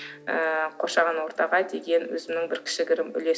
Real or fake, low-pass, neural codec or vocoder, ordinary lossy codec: real; none; none; none